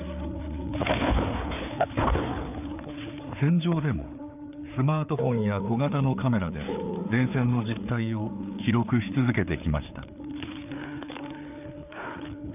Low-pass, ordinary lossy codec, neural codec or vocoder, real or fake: 3.6 kHz; none; codec, 16 kHz, 4 kbps, FreqCodec, larger model; fake